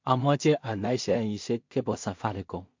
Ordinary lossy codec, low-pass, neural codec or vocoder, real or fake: MP3, 48 kbps; 7.2 kHz; codec, 16 kHz in and 24 kHz out, 0.4 kbps, LongCat-Audio-Codec, two codebook decoder; fake